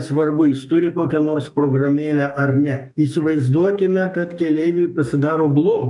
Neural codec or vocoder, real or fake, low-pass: codec, 32 kHz, 1.9 kbps, SNAC; fake; 10.8 kHz